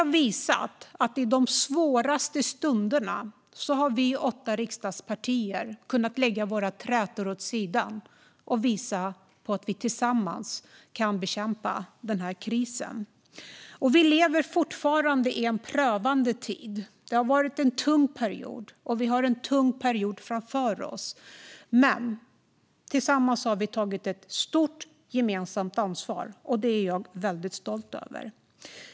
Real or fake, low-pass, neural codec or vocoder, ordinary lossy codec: real; none; none; none